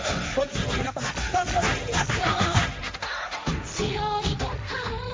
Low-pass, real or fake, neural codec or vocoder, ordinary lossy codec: none; fake; codec, 16 kHz, 1.1 kbps, Voila-Tokenizer; none